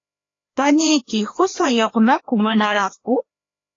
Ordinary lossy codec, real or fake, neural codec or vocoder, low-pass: AAC, 32 kbps; fake; codec, 16 kHz, 1 kbps, FreqCodec, larger model; 7.2 kHz